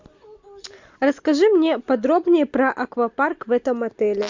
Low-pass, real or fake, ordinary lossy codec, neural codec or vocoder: 7.2 kHz; real; MP3, 64 kbps; none